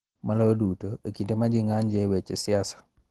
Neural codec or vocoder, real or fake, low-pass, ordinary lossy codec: none; real; 10.8 kHz; Opus, 16 kbps